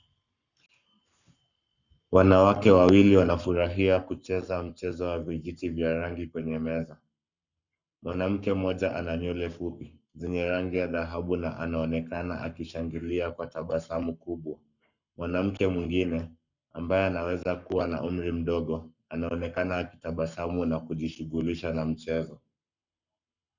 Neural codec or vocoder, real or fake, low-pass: codec, 44.1 kHz, 7.8 kbps, Pupu-Codec; fake; 7.2 kHz